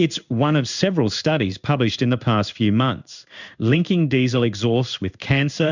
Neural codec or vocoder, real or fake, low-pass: codec, 16 kHz in and 24 kHz out, 1 kbps, XY-Tokenizer; fake; 7.2 kHz